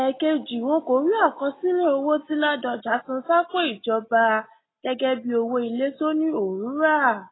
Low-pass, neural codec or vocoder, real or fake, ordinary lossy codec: 7.2 kHz; none; real; AAC, 16 kbps